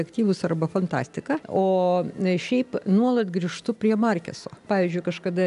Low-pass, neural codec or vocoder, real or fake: 10.8 kHz; none; real